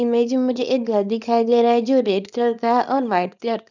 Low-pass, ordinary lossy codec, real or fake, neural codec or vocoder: 7.2 kHz; none; fake; codec, 24 kHz, 0.9 kbps, WavTokenizer, small release